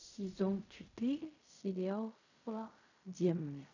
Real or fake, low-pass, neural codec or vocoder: fake; 7.2 kHz; codec, 16 kHz in and 24 kHz out, 0.4 kbps, LongCat-Audio-Codec, fine tuned four codebook decoder